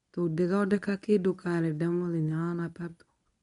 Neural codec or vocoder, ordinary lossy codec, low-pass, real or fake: codec, 24 kHz, 0.9 kbps, WavTokenizer, medium speech release version 1; MP3, 96 kbps; 10.8 kHz; fake